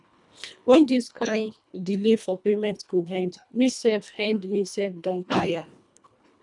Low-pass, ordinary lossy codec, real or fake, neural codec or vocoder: 10.8 kHz; none; fake; codec, 24 kHz, 1.5 kbps, HILCodec